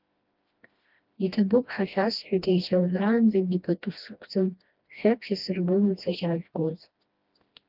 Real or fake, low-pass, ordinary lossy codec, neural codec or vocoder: fake; 5.4 kHz; Opus, 32 kbps; codec, 16 kHz, 1 kbps, FreqCodec, smaller model